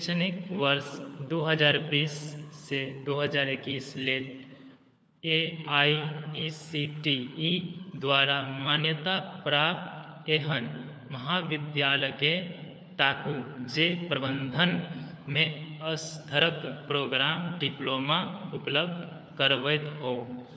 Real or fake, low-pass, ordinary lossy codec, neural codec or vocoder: fake; none; none; codec, 16 kHz, 4 kbps, FunCodec, trained on LibriTTS, 50 frames a second